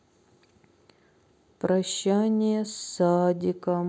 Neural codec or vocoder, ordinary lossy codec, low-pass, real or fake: none; none; none; real